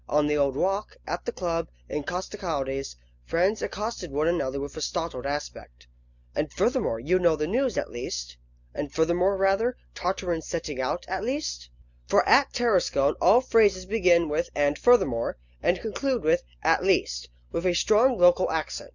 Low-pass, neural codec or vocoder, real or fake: 7.2 kHz; none; real